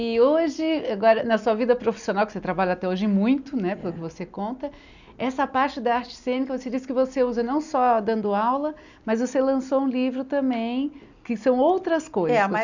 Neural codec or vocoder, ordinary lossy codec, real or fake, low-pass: none; none; real; 7.2 kHz